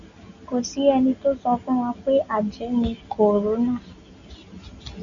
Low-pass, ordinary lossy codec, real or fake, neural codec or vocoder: 7.2 kHz; Opus, 64 kbps; real; none